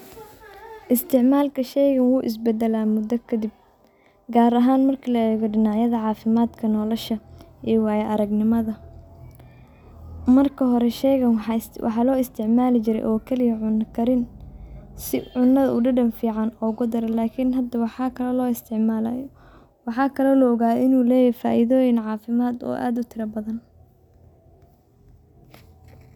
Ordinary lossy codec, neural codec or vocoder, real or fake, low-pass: none; none; real; 19.8 kHz